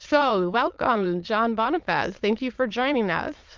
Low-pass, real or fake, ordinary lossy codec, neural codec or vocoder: 7.2 kHz; fake; Opus, 32 kbps; autoencoder, 22.05 kHz, a latent of 192 numbers a frame, VITS, trained on many speakers